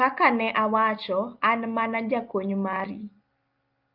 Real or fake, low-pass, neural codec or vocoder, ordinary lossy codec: real; 5.4 kHz; none; Opus, 32 kbps